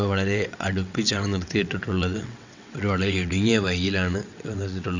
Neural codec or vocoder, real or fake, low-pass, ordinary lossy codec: vocoder, 22.05 kHz, 80 mel bands, WaveNeXt; fake; 7.2 kHz; Opus, 64 kbps